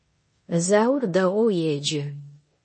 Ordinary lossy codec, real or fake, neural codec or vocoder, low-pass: MP3, 32 kbps; fake; codec, 16 kHz in and 24 kHz out, 0.9 kbps, LongCat-Audio-Codec, four codebook decoder; 10.8 kHz